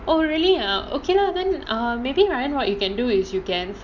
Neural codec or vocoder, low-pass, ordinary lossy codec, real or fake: none; 7.2 kHz; none; real